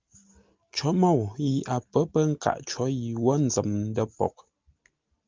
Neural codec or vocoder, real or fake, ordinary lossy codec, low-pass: none; real; Opus, 32 kbps; 7.2 kHz